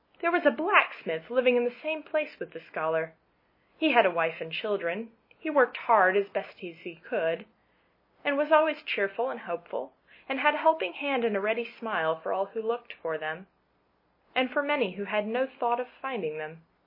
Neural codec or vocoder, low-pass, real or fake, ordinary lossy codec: none; 5.4 kHz; real; MP3, 24 kbps